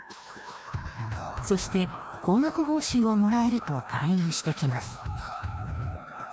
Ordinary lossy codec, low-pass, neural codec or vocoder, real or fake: none; none; codec, 16 kHz, 1 kbps, FreqCodec, larger model; fake